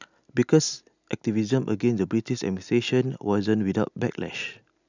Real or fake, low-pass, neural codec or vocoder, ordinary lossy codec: real; 7.2 kHz; none; none